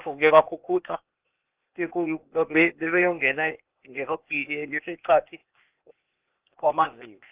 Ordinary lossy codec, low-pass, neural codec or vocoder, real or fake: Opus, 24 kbps; 3.6 kHz; codec, 16 kHz, 0.8 kbps, ZipCodec; fake